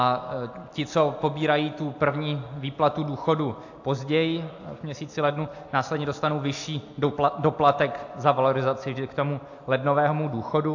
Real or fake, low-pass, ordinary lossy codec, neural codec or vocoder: real; 7.2 kHz; AAC, 48 kbps; none